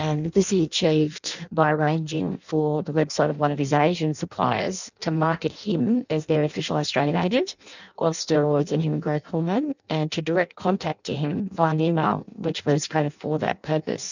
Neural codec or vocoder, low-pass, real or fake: codec, 16 kHz in and 24 kHz out, 0.6 kbps, FireRedTTS-2 codec; 7.2 kHz; fake